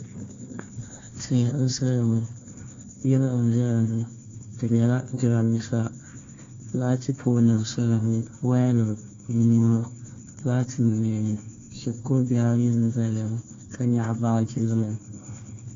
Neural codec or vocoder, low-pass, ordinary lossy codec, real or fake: codec, 16 kHz, 1 kbps, FunCodec, trained on Chinese and English, 50 frames a second; 7.2 kHz; AAC, 32 kbps; fake